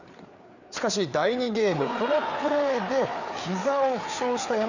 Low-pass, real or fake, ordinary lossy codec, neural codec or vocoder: 7.2 kHz; fake; none; codec, 16 kHz, 4 kbps, FreqCodec, larger model